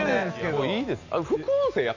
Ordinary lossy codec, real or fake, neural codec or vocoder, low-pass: none; real; none; 7.2 kHz